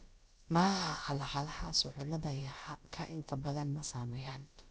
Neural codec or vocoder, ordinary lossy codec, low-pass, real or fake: codec, 16 kHz, about 1 kbps, DyCAST, with the encoder's durations; none; none; fake